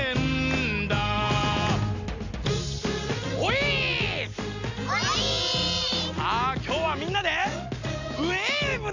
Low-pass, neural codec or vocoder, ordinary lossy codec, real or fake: 7.2 kHz; none; none; real